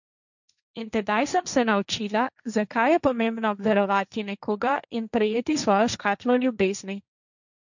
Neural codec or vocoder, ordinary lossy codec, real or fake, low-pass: codec, 16 kHz, 1.1 kbps, Voila-Tokenizer; none; fake; 7.2 kHz